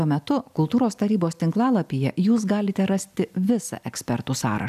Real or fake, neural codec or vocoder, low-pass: real; none; 14.4 kHz